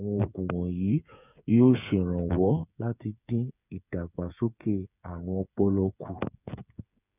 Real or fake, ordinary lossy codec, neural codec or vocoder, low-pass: fake; none; codec, 16 kHz, 8 kbps, FreqCodec, smaller model; 3.6 kHz